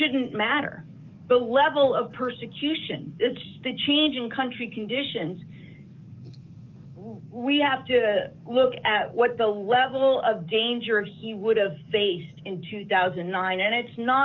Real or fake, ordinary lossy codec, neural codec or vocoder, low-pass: fake; Opus, 24 kbps; codec, 44.1 kHz, 7.8 kbps, DAC; 7.2 kHz